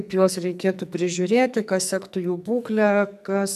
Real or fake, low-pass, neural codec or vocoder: fake; 14.4 kHz; codec, 44.1 kHz, 2.6 kbps, SNAC